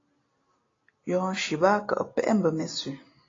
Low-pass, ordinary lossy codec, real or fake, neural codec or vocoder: 7.2 kHz; AAC, 32 kbps; real; none